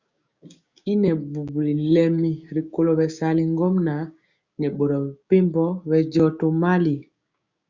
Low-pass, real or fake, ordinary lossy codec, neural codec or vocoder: 7.2 kHz; fake; Opus, 64 kbps; codec, 16 kHz, 6 kbps, DAC